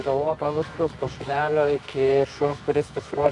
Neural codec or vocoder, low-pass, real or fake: codec, 24 kHz, 0.9 kbps, WavTokenizer, medium music audio release; 10.8 kHz; fake